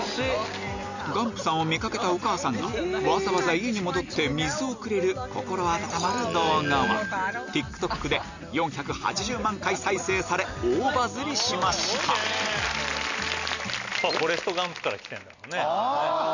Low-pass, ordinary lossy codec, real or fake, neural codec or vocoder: 7.2 kHz; none; real; none